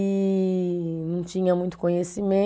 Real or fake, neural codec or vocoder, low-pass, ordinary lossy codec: real; none; none; none